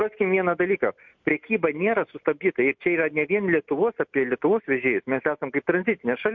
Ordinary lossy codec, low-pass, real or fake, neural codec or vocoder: MP3, 48 kbps; 7.2 kHz; real; none